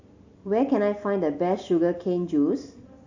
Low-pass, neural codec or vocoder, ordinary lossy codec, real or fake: 7.2 kHz; none; AAC, 48 kbps; real